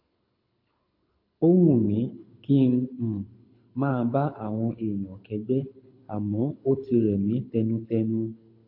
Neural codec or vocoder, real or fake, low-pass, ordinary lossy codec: codec, 24 kHz, 6 kbps, HILCodec; fake; 5.4 kHz; MP3, 32 kbps